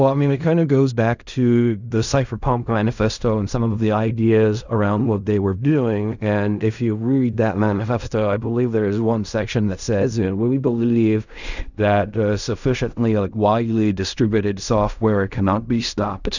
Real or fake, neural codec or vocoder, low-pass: fake; codec, 16 kHz in and 24 kHz out, 0.4 kbps, LongCat-Audio-Codec, fine tuned four codebook decoder; 7.2 kHz